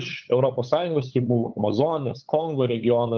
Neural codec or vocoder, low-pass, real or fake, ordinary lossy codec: codec, 16 kHz, 8 kbps, FunCodec, trained on LibriTTS, 25 frames a second; 7.2 kHz; fake; Opus, 32 kbps